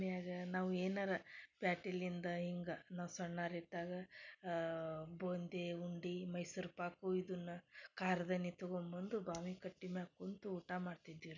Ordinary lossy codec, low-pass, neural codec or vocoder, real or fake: none; 7.2 kHz; none; real